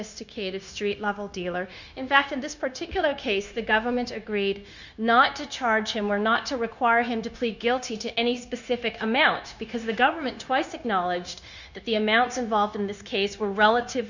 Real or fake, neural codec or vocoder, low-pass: fake; codec, 16 kHz, 0.9 kbps, LongCat-Audio-Codec; 7.2 kHz